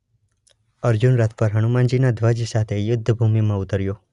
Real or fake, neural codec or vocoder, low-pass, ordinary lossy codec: real; none; 10.8 kHz; Opus, 64 kbps